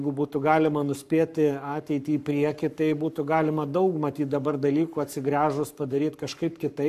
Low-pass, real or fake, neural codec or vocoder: 14.4 kHz; fake; codec, 44.1 kHz, 7.8 kbps, Pupu-Codec